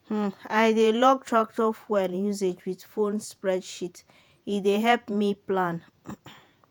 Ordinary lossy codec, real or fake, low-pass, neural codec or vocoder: none; fake; none; vocoder, 48 kHz, 128 mel bands, Vocos